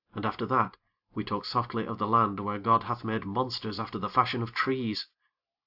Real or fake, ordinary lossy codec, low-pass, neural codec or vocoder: real; AAC, 48 kbps; 5.4 kHz; none